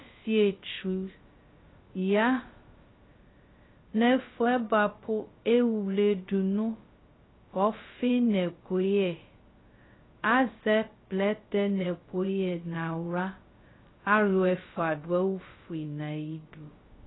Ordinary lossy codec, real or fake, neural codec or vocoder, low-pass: AAC, 16 kbps; fake; codec, 16 kHz, 0.2 kbps, FocalCodec; 7.2 kHz